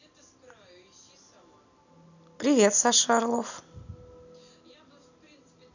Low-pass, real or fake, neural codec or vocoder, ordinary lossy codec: 7.2 kHz; real; none; none